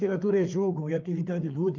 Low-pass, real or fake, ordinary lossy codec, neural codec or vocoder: 7.2 kHz; fake; Opus, 24 kbps; codec, 16 kHz, 4 kbps, FreqCodec, larger model